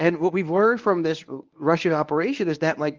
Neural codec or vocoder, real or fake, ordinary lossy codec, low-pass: codec, 24 kHz, 0.9 kbps, WavTokenizer, medium speech release version 1; fake; Opus, 24 kbps; 7.2 kHz